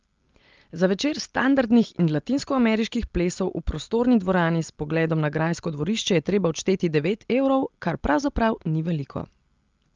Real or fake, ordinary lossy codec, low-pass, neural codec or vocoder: real; Opus, 32 kbps; 7.2 kHz; none